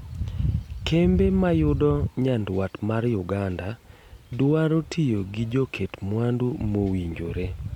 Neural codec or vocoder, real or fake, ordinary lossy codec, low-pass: none; real; MP3, 96 kbps; 19.8 kHz